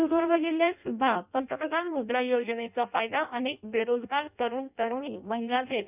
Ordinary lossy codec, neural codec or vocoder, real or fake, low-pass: none; codec, 16 kHz in and 24 kHz out, 0.6 kbps, FireRedTTS-2 codec; fake; 3.6 kHz